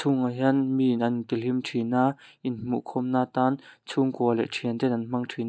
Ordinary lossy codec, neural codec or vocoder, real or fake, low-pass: none; none; real; none